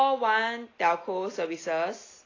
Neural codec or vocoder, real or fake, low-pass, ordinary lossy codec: none; real; 7.2 kHz; AAC, 32 kbps